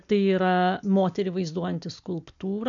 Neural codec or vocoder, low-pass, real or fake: none; 7.2 kHz; real